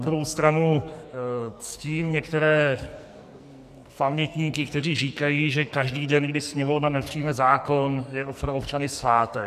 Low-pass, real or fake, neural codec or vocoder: 14.4 kHz; fake; codec, 44.1 kHz, 2.6 kbps, SNAC